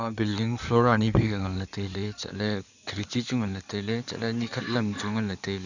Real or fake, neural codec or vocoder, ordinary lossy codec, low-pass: fake; codec, 16 kHz in and 24 kHz out, 2.2 kbps, FireRedTTS-2 codec; none; 7.2 kHz